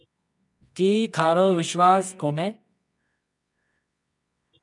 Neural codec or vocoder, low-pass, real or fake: codec, 24 kHz, 0.9 kbps, WavTokenizer, medium music audio release; 10.8 kHz; fake